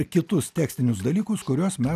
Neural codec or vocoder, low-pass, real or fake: none; 14.4 kHz; real